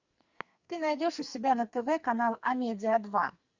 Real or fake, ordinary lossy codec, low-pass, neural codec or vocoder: fake; Opus, 64 kbps; 7.2 kHz; codec, 44.1 kHz, 2.6 kbps, SNAC